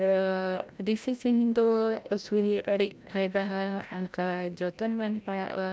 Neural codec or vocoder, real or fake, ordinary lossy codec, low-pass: codec, 16 kHz, 0.5 kbps, FreqCodec, larger model; fake; none; none